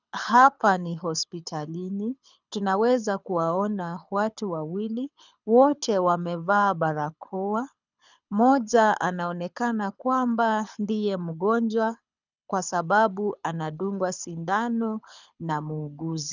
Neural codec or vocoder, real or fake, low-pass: codec, 24 kHz, 6 kbps, HILCodec; fake; 7.2 kHz